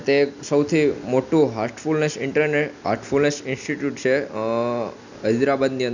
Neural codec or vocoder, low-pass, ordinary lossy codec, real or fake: none; 7.2 kHz; none; real